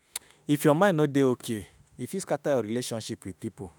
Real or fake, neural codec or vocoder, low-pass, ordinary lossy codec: fake; autoencoder, 48 kHz, 32 numbers a frame, DAC-VAE, trained on Japanese speech; none; none